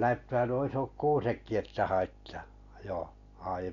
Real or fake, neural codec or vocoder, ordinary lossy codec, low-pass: real; none; MP3, 96 kbps; 7.2 kHz